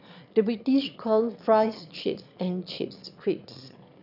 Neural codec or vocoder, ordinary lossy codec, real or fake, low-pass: autoencoder, 22.05 kHz, a latent of 192 numbers a frame, VITS, trained on one speaker; none; fake; 5.4 kHz